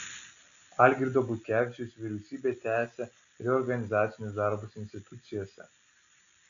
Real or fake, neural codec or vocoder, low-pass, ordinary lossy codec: real; none; 7.2 kHz; AAC, 96 kbps